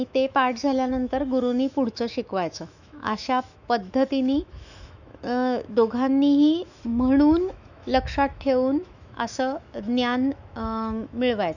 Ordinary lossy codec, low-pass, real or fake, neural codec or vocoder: none; 7.2 kHz; fake; autoencoder, 48 kHz, 128 numbers a frame, DAC-VAE, trained on Japanese speech